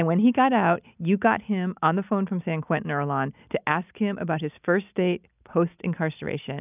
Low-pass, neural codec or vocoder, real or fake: 3.6 kHz; none; real